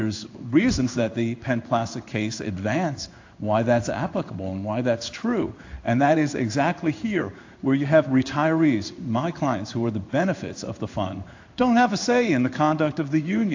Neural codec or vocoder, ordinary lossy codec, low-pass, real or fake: codec, 16 kHz in and 24 kHz out, 1 kbps, XY-Tokenizer; AAC, 48 kbps; 7.2 kHz; fake